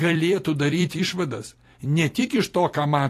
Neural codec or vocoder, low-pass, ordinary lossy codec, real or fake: vocoder, 44.1 kHz, 128 mel bands every 256 samples, BigVGAN v2; 14.4 kHz; AAC, 48 kbps; fake